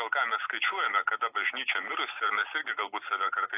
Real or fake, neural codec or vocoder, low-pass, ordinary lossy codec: real; none; 3.6 kHz; AAC, 24 kbps